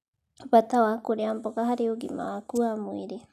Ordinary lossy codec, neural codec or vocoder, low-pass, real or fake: none; none; 14.4 kHz; real